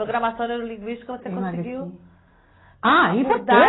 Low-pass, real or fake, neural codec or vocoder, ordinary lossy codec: 7.2 kHz; real; none; AAC, 16 kbps